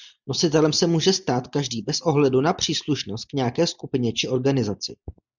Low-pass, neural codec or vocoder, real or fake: 7.2 kHz; none; real